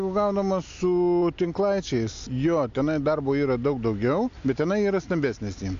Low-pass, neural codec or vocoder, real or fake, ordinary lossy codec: 7.2 kHz; none; real; AAC, 64 kbps